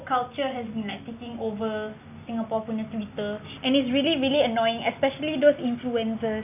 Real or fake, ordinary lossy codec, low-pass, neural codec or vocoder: real; none; 3.6 kHz; none